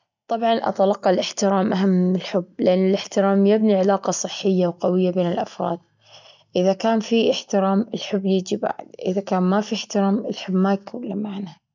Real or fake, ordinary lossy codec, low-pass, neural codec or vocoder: real; none; 7.2 kHz; none